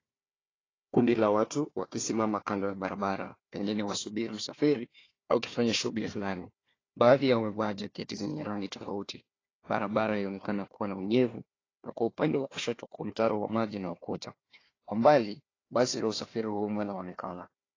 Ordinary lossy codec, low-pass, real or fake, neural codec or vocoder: AAC, 32 kbps; 7.2 kHz; fake; codec, 16 kHz, 1 kbps, FunCodec, trained on Chinese and English, 50 frames a second